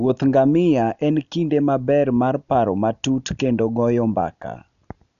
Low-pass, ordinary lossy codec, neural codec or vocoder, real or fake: 7.2 kHz; none; none; real